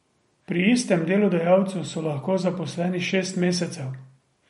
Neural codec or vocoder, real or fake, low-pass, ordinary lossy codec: none; real; 19.8 kHz; MP3, 48 kbps